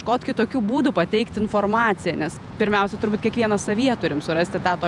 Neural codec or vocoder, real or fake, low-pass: vocoder, 48 kHz, 128 mel bands, Vocos; fake; 10.8 kHz